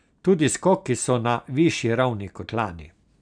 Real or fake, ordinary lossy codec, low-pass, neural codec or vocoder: real; none; 9.9 kHz; none